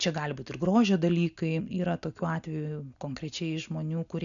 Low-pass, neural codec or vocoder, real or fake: 7.2 kHz; none; real